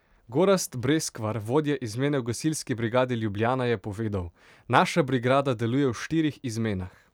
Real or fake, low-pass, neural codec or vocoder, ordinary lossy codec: fake; 19.8 kHz; vocoder, 44.1 kHz, 128 mel bands every 256 samples, BigVGAN v2; none